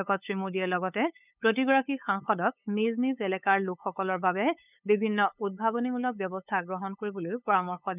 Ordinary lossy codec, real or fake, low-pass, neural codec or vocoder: none; fake; 3.6 kHz; codec, 16 kHz, 8 kbps, FunCodec, trained on LibriTTS, 25 frames a second